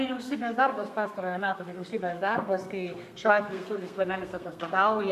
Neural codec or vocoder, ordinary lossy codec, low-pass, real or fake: codec, 32 kHz, 1.9 kbps, SNAC; AAC, 96 kbps; 14.4 kHz; fake